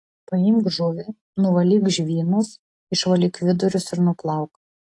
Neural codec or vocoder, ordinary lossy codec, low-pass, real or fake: none; AAC, 48 kbps; 10.8 kHz; real